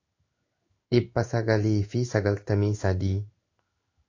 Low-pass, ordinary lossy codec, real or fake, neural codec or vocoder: 7.2 kHz; AAC, 48 kbps; fake; codec, 16 kHz in and 24 kHz out, 1 kbps, XY-Tokenizer